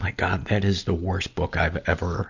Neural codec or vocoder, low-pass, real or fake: none; 7.2 kHz; real